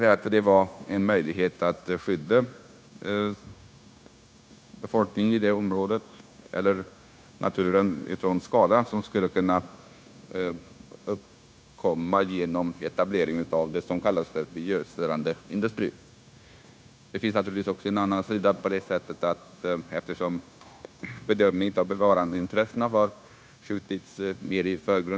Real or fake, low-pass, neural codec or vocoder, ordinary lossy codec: fake; none; codec, 16 kHz, 0.9 kbps, LongCat-Audio-Codec; none